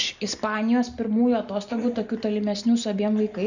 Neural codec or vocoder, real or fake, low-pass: none; real; 7.2 kHz